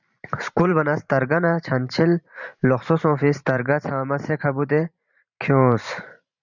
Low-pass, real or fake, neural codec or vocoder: 7.2 kHz; fake; vocoder, 44.1 kHz, 128 mel bands every 512 samples, BigVGAN v2